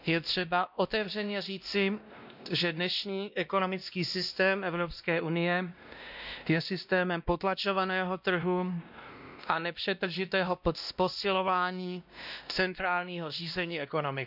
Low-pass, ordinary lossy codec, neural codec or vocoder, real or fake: 5.4 kHz; none; codec, 16 kHz, 1 kbps, X-Codec, WavLM features, trained on Multilingual LibriSpeech; fake